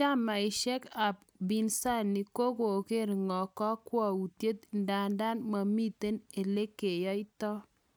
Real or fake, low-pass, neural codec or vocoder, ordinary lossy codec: real; none; none; none